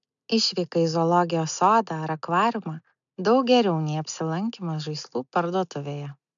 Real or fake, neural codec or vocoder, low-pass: real; none; 7.2 kHz